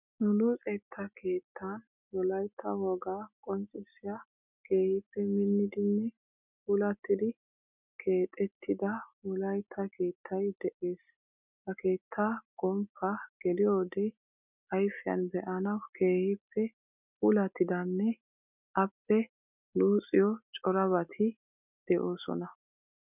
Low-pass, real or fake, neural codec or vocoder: 3.6 kHz; real; none